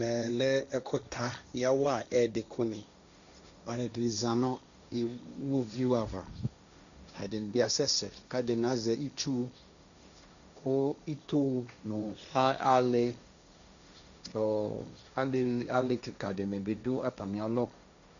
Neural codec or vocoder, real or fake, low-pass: codec, 16 kHz, 1.1 kbps, Voila-Tokenizer; fake; 7.2 kHz